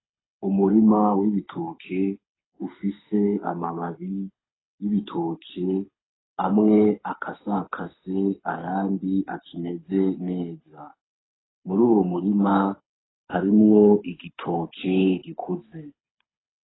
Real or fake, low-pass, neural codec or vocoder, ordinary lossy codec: fake; 7.2 kHz; codec, 24 kHz, 6 kbps, HILCodec; AAC, 16 kbps